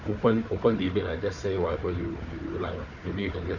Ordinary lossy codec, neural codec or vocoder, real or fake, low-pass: none; codec, 16 kHz, 4 kbps, FunCodec, trained on Chinese and English, 50 frames a second; fake; 7.2 kHz